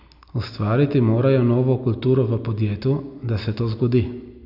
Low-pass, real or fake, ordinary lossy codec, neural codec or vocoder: 5.4 kHz; real; none; none